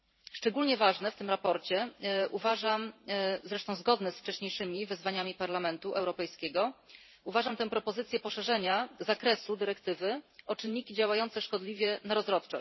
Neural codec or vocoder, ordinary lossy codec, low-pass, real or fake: vocoder, 44.1 kHz, 128 mel bands every 512 samples, BigVGAN v2; MP3, 24 kbps; 7.2 kHz; fake